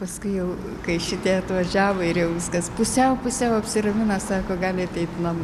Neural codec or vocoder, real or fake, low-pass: none; real; 14.4 kHz